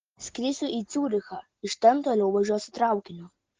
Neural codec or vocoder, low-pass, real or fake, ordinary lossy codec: none; 7.2 kHz; real; Opus, 16 kbps